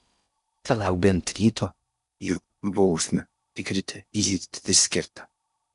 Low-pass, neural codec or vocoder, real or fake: 10.8 kHz; codec, 16 kHz in and 24 kHz out, 0.6 kbps, FocalCodec, streaming, 4096 codes; fake